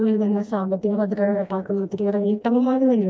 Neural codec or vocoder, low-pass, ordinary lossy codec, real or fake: codec, 16 kHz, 1 kbps, FreqCodec, smaller model; none; none; fake